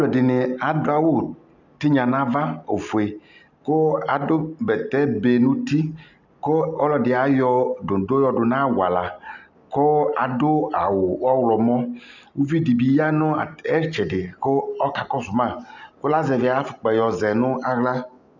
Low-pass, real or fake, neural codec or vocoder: 7.2 kHz; real; none